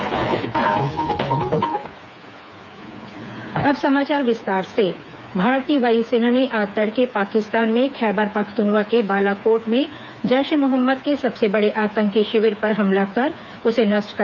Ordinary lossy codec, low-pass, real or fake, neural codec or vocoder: none; 7.2 kHz; fake; codec, 16 kHz, 4 kbps, FreqCodec, smaller model